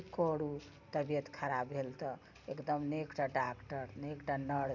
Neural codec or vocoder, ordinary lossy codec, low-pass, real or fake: none; none; 7.2 kHz; real